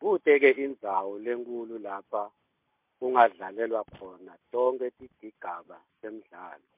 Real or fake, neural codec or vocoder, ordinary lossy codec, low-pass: real; none; MP3, 32 kbps; 3.6 kHz